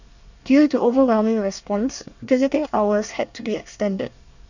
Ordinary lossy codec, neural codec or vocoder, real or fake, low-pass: none; codec, 24 kHz, 1 kbps, SNAC; fake; 7.2 kHz